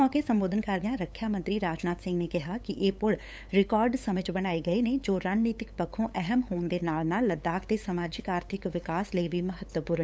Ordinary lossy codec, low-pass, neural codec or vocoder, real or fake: none; none; codec, 16 kHz, 8 kbps, FunCodec, trained on LibriTTS, 25 frames a second; fake